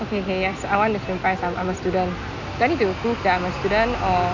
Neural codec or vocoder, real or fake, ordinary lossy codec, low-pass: none; real; none; 7.2 kHz